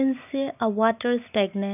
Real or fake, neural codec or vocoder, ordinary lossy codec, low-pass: real; none; none; 3.6 kHz